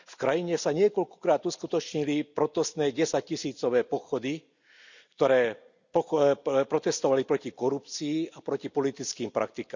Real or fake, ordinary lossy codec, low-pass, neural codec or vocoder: real; none; 7.2 kHz; none